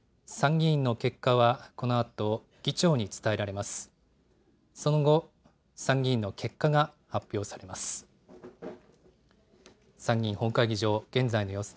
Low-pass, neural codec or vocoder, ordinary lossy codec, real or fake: none; none; none; real